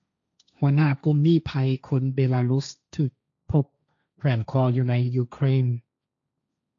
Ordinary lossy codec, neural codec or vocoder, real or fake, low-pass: MP3, 64 kbps; codec, 16 kHz, 1.1 kbps, Voila-Tokenizer; fake; 7.2 kHz